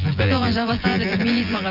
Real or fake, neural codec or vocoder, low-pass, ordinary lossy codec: fake; vocoder, 24 kHz, 100 mel bands, Vocos; 5.4 kHz; none